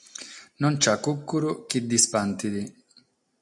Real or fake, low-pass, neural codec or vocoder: real; 10.8 kHz; none